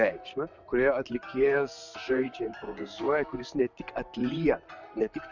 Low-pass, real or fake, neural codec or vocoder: 7.2 kHz; fake; vocoder, 44.1 kHz, 128 mel bands, Pupu-Vocoder